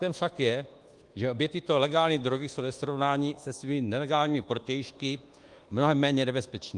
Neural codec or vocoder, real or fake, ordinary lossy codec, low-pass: codec, 24 kHz, 1.2 kbps, DualCodec; fake; Opus, 24 kbps; 10.8 kHz